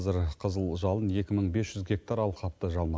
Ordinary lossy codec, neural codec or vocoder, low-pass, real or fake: none; none; none; real